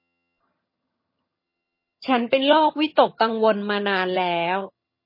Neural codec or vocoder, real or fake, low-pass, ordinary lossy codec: vocoder, 22.05 kHz, 80 mel bands, HiFi-GAN; fake; 5.4 kHz; MP3, 24 kbps